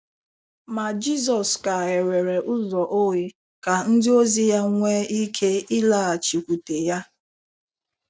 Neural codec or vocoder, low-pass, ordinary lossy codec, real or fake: none; none; none; real